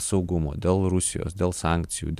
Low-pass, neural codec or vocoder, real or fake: 14.4 kHz; none; real